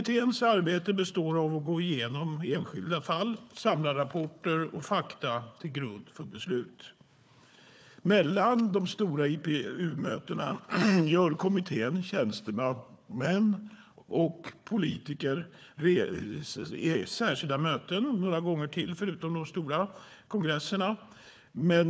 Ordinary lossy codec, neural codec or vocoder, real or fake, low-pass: none; codec, 16 kHz, 4 kbps, FunCodec, trained on Chinese and English, 50 frames a second; fake; none